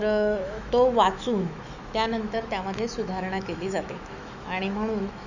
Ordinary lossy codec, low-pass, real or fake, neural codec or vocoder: none; 7.2 kHz; fake; autoencoder, 48 kHz, 128 numbers a frame, DAC-VAE, trained on Japanese speech